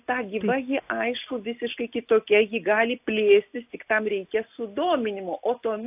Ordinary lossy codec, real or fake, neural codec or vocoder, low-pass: AAC, 32 kbps; real; none; 3.6 kHz